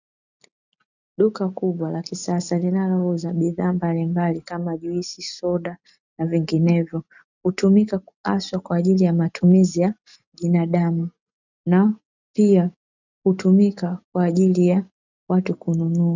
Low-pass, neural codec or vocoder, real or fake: 7.2 kHz; none; real